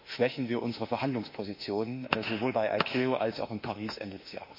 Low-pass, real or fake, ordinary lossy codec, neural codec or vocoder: 5.4 kHz; fake; MP3, 32 kbps; autoencoder, 48 kHz, 32 numbers a frame, DAC-VAE, trained on Japanese speech